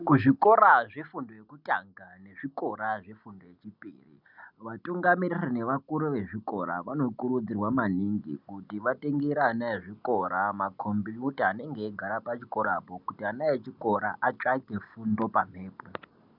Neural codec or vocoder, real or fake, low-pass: none; real; 5.4 kHz